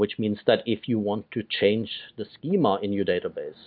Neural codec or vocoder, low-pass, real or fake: none; 5.4 kHz; real